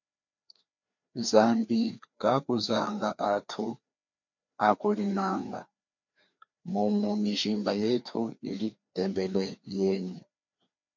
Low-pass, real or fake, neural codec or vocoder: 7.2 kHz; fake; codec, 16 kHz, 2 kbps, FreqCodec, larger model